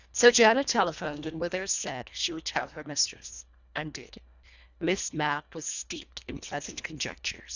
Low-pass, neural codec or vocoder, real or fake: 7.2 kHz; codec, 24 kHz, 1.5 kbps, HILCodec; fake